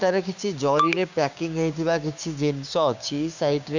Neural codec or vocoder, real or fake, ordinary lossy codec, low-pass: codec, 16 kHz, 6 kbps, DAC; fake; none; 7.2 kHz